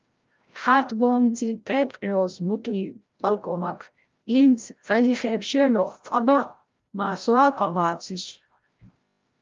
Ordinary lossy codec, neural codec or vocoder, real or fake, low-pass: Opus, 32 kbps; codec, 16 kHz, 0.5 kbps, FreqCodec, larger model; fake; 7.2 kHz